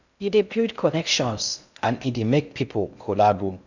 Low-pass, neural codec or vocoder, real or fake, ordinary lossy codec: 7.2 kHz; codec, 16 kHz in and 24 kHz out, 0.6 kbps, FocalCodec, streaming, 2048 codes; fake; none